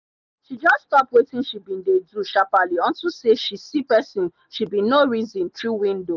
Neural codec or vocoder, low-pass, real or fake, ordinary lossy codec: none; 7.2 kHz; real; none